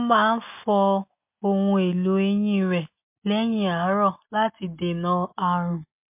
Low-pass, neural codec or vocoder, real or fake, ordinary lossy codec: 3.6 kHz; none; real; MP3, 32 kbps